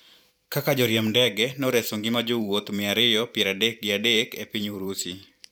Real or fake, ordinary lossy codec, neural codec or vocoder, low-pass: fake; none; vocoder, 44.1 kHz, 128 mel bands every 512 samples, BigVGAN v2; 19.8 kHz